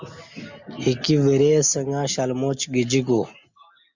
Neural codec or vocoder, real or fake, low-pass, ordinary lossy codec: none; real; 7.2 kHz; MP3, 64 kbps